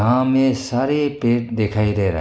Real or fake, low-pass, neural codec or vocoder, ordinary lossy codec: real; none; none; none